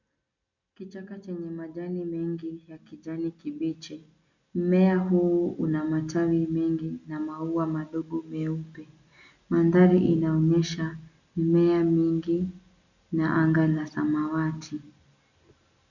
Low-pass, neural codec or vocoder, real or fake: 7.2 kHz; none; real